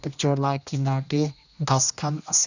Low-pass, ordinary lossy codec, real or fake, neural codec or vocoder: 7.2 kHz; none; fake; codec, 16 kHz, 1 kbps, X-Codec, HuBERT features, trained on general audio